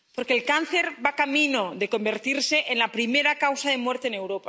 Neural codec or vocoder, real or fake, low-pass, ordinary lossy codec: none; real; none; none